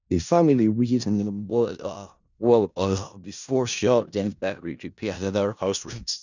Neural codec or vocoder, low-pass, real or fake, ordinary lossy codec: codec, 16 kHz in and 24 kHz out, 0.4 kbps, LongCat-Audio-Codec, four codebook decoder; 7.2 kHz; fake; none